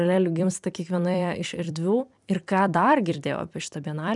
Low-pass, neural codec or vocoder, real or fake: 10.8 kHz; vocoder, 44.1 kHz, 128 mel bands every 256 samples, BigVGAN v2; fake